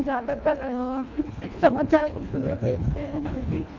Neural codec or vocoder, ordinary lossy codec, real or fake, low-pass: codec, 24 kHz, 1.5 kbps, HILCodec; none; fake; 7.2 kHz